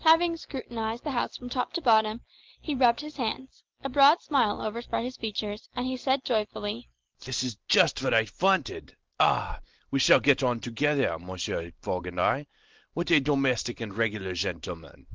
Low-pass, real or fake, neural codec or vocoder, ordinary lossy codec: 7.2 kHz; real; none; Opus, 16 kbps